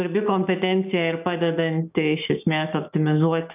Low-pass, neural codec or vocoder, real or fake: 3.6 kHz; codec, 16 kHz, 6 kbps, DAC; fake